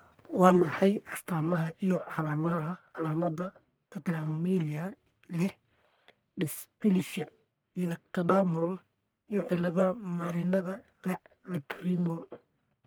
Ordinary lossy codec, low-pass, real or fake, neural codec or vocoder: none; none; fake; codec, 44.1 kHz, 1.7 kbps, Pupu-Codec